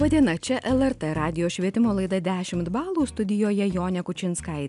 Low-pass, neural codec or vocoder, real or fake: 10.8 kHz; none; real